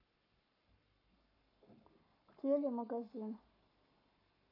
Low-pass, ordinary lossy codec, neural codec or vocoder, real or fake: 5.4 kHz; none; codec, 44.1 kHz, 7.8 kbps, Pupu-Codec; fake